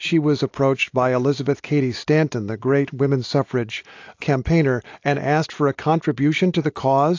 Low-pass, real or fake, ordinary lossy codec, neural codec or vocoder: 7.2 kHz; real; AAC, 48 kbps; none